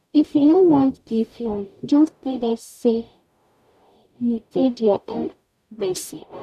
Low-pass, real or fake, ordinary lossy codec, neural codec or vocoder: 14.4 kHz; fake; none; codec, 44.1 kHz, 0.9 kbps, DAC